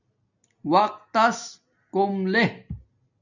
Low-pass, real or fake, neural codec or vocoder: 7.2 kHz; real; none